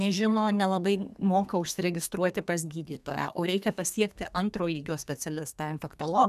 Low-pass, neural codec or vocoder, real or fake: 14.4 kHz; codec, 32 kHz, 1.9 kbps, SNAC; fake